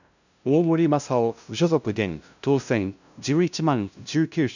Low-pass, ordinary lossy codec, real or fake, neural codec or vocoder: 7.2 kHz; none; fake; codec, 16 kHz, 0.5 kbps, FunCodec, trained on LibriTTS, 25 frames a second